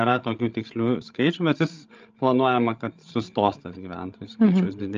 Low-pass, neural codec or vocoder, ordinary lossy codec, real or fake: 7.2 kHz; codec, 16 kHz, 16 kbps, FreqCodec, larger model; Opus, 24 kbps; fake